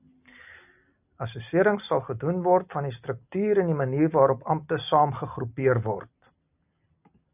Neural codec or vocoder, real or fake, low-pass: none; real; 3.6 kHz